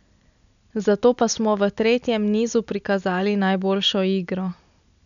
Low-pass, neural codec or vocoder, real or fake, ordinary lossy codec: 7.2 kHz; none; real; none